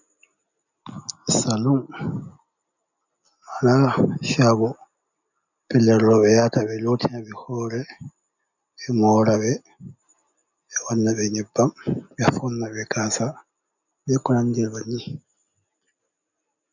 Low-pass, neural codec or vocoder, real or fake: 7.2 kHz; none; real